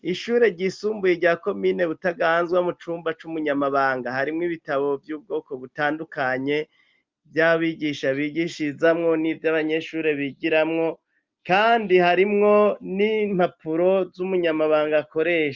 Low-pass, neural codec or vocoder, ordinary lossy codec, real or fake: 7.2 kHz; none; Opus, 24 kbps; real